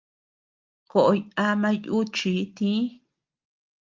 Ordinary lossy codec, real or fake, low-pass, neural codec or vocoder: Opus, 24 kbps; real; 7.2 kHz; none